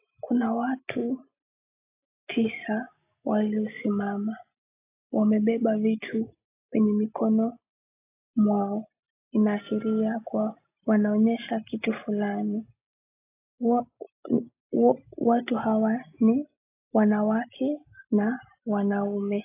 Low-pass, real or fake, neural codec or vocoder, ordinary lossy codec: 3.6 kHz; real; none; AAC, 24 kbps